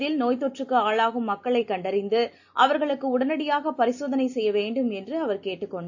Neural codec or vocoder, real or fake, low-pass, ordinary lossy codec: none; real; 7.2 kHz; AAC, 48 kbps